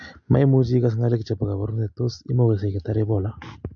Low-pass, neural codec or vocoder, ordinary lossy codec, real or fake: 7.2 kHz; none; MP3, 48 kbps; real